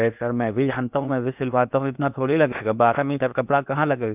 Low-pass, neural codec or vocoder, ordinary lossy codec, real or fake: 3.6 kHz; codec, 16 kHz in and 24 kHz out, 0.8 kbps, FocalCodec, streaming, 65536 codes; none; fake